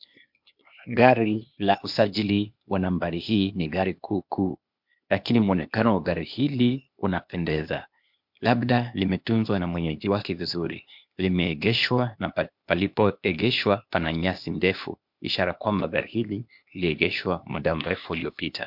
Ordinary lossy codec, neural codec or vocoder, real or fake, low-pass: MP3, 48 kbps; codec, 16 kHz, 0.8 kbps, ZipCodec; fake; 5.4 kHz